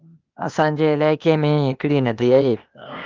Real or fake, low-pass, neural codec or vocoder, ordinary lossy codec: fake; 7.2 kHz; codec, 16 kHz, 0.8 kbps, ZipCodec; Opus, 24 kbps